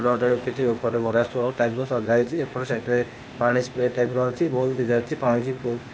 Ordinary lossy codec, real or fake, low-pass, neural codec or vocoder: none; fake; none; codec, 16 kHz, 0.8 kbps, ZipCodec